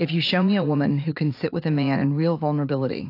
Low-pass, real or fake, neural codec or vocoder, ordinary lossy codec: 5.4 kHz; fake; vocoder, 44.1 kHz, 80 mel bands, Vocos; AAC, 32 kbps